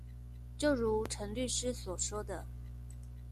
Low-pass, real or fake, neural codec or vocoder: 14.4 kHz; real; none